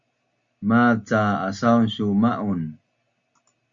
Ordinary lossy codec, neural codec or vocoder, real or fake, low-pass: Opus, 64 kbps; none; real; 7.2 kHz